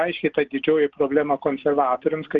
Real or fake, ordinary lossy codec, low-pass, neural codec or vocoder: real; Opus, 32 kbps; 7.2 kHz; none